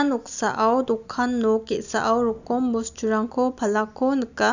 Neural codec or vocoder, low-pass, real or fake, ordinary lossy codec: none; 7.2 kHz; real; none